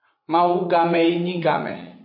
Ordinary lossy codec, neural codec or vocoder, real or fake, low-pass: MP3, 32 kbps; vocoder, 44.1 kHz, 80 mel bands, Vocos; fake; 5.4 kHz